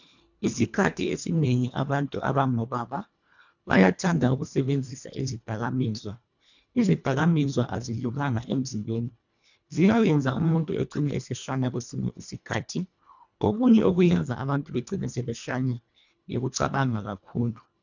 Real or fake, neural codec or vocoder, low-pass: fake; codec, 24 kHz, 1.5 kbps, HILCodec; 7.2 kHz